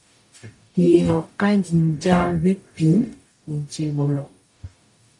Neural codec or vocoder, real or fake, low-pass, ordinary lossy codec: codec, 44.1 kHz, 0.9 kbps, DAC; fake; 10.8 kHz; AAC, 64 kbps